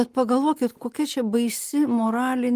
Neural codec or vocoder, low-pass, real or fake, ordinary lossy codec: none; 14.4 kHz; real; Opus, 32 kbps